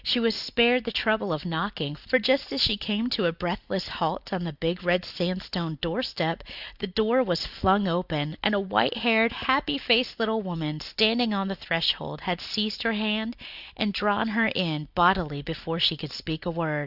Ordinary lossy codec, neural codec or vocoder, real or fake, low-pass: Opus, 64 kbps; none; real; 5.4 kHz